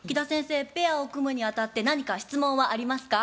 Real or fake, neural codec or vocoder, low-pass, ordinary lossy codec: real; none; none; none